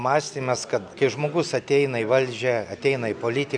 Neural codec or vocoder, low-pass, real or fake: none; 9.9 kHz; real